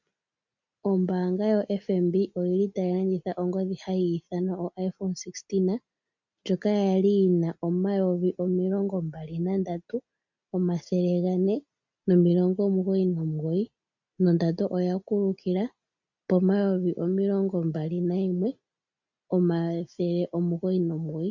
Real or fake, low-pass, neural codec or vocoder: real; 7.2 kHz; none